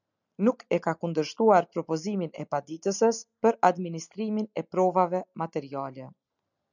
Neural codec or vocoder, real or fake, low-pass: none; real; 7.2 kHz